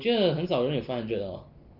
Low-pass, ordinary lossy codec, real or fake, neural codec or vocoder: 5.4 kHz; Opus, 32 kbps; real; none